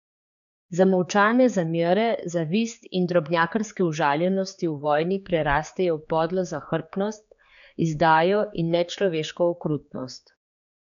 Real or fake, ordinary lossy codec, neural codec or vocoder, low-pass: fake; none; codec, 16 kHz, 4 kbps, X-Codec, HuBERT features, trained on general audio; 7.2 kHz